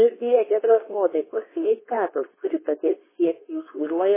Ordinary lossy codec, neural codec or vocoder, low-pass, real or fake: MP3, 16 kbps; codec, 24 kHz, 0.9 kbps, WavTokenizer, medium speech release version 2; 3.6 kHz; fake